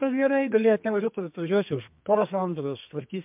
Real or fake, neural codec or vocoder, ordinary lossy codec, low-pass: fake; codec, 32 kHz, 1.9 kbps, SNAC; MP3, 32 kbps; 3.6 kHz